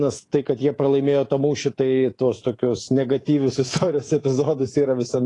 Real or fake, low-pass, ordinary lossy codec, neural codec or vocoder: fake; 10.8 kHz; AAC, 32 kbps; codec, 24 kHz, 3.1 kbps, DualCodec